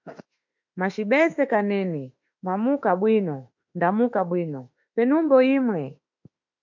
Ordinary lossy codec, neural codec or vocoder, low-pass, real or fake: MP3, 64 kbps; autoencoder, 48 kHz, 32 numbers a frame, DAC-VAE, trained on Japanese speech; 7.2 kHz; fake